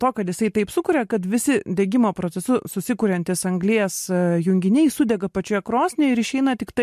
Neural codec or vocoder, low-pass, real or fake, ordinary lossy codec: none; 14.4 kHz; real; MP3, 64 kbps